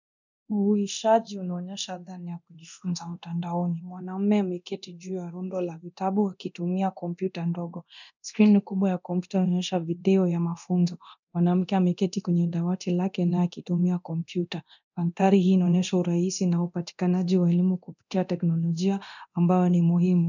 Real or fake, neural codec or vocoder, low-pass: fake; codec, 24 kHz, 0.9 kbps, DualCodec; 7.2 kHz